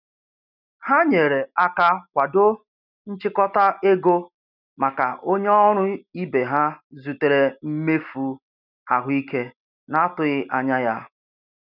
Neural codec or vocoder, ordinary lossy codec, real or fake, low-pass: none; none; real; 5.4 kHz